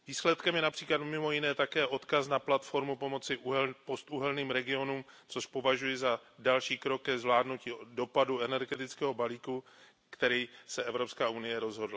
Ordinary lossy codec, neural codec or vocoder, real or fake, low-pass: none; none; real; none